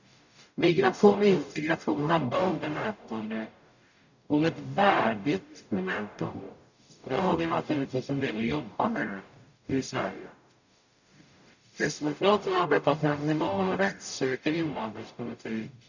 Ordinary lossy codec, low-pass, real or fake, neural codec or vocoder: none; 7.2 kHz; fake; codec, 44.1 kHz, 0.9 kbps, DAC